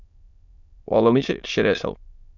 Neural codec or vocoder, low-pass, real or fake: autoencoder, 22.05 kHz, a latent of 192 numbers a frame, VITS, trained on many speakers; 7.2 kHz; fake